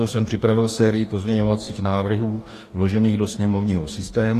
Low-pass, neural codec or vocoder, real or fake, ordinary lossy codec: 14.4 kHz; codec, 44.1 kHz, 2.6 kbps, DAC; fake; AAC, 48 kbps